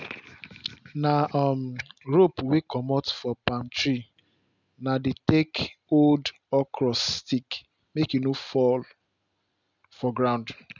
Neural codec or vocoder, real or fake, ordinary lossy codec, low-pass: none; real; none; 7.2 kHz